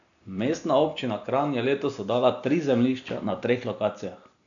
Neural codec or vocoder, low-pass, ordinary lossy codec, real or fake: none; 7.2 kHz; none; real